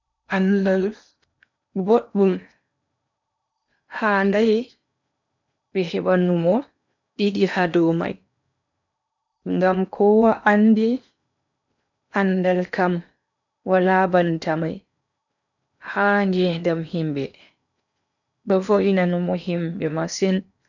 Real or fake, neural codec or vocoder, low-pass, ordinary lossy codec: fake; codec, 16 kHz in and 24 kHz out, 0.8 kbps, FocalCodec, streaming, 65536 codes; 7.2 kHz; none